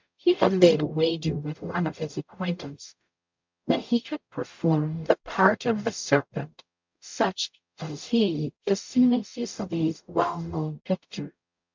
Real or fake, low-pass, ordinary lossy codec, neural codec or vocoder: fake; 7.2 kHz; MP3, 64 kbps; codec, 44.1 kHz, 0.9 kbps, DAC